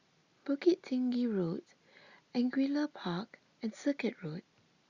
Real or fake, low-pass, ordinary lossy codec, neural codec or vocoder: real; 7.2 kHz; Opus, 64 kbps; none